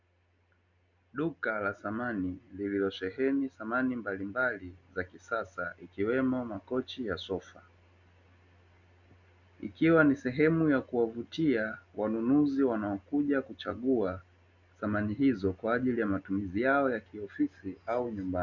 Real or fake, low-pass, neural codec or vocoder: real; 7.2 kHz; none